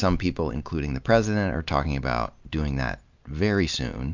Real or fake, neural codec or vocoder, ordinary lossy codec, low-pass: real; none; MP3, 64 kbps; 7.2 kHz